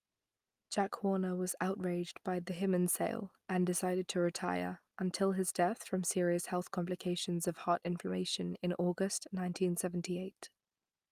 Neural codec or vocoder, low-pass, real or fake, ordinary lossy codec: none; 14.4 kHz; real; Opus, 32 kbps